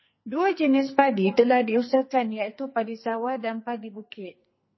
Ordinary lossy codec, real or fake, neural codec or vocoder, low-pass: MP3, 24 kbps; fake; codec, 44.1 kHz, 2.6 kbps, SNAC; 7.2 kHz